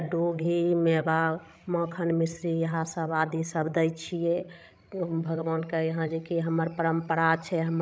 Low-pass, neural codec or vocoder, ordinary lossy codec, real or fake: none; codec, 16 kHz, 16 kbps, FreqCodec, larger model; none; fake